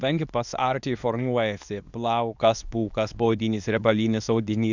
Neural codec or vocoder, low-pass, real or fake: codec, 24 kHz, 0.9 kbps, WavTokenizer, medium speech release version 2; 7.2 kHz; fake